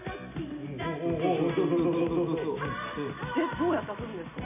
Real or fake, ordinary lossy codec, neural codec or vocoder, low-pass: real; none; none; 3.6 kHz